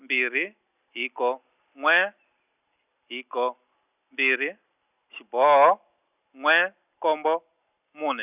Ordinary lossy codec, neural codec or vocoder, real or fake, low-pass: none; none; real; 3.6 kHz